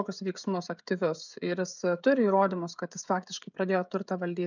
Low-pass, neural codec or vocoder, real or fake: 7.2 kHz; codec, 16 kHz, 16 kbps, FreqCodec, smaller model; fake